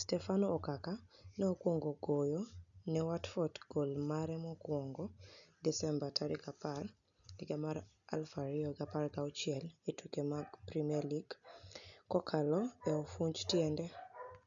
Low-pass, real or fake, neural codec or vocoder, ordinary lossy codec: 7.2 kHz; real; none; none